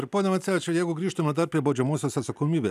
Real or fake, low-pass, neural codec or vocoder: real; 14.4 kHz; none